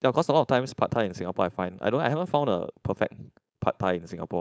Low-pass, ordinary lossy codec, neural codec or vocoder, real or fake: none; none; codec, 16 kHz, 4.8 kbps, FACodec; fake